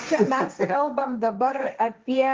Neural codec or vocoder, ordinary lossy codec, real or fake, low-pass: codec, 16 kHz, 1.1 kbps, Voila-Tokenizer; Opus, 24 kbps; fake; 7.2 kHz